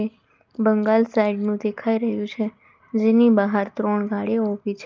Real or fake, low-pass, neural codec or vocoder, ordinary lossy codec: real; 7.2 kHz; none; Opus, 32 kbps